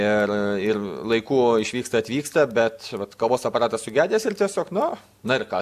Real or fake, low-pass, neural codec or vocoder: real; 14.4 kHz; none